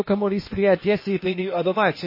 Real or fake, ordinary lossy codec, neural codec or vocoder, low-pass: fake; MP3, 24 kbps; codec, 16 kHz, 0.8 kbps, ZipCodec; 5.4 kHz